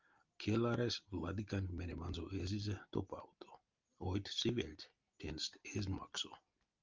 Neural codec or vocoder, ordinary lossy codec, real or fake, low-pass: none; Opus, 24 kbps; real; 7.2 kHz